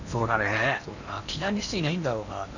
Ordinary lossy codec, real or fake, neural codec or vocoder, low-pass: none; fake; codec, 16 kHz in and 24 kHz out, 0.8 kbps, FocalCodec, streaming, 65536 codes; 7.2 kHz